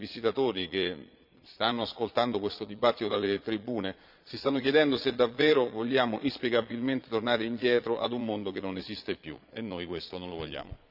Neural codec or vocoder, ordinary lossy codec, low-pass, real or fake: vocoder, 22.05 kHz, 80 mel bands, Vocos; none; 5.4 kHz; fake